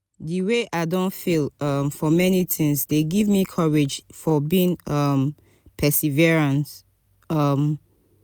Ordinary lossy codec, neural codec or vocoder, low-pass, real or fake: none; none; none; real